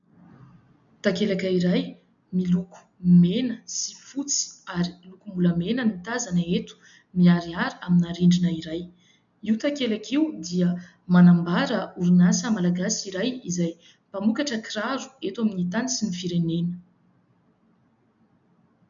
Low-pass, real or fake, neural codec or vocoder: 7.2 kHz; real; none